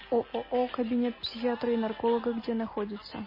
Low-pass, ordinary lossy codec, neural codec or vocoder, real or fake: 5.4 kHz; MP3, 24 kbps; none; real